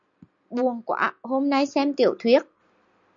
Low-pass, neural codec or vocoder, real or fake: 7.2 kHz; none; real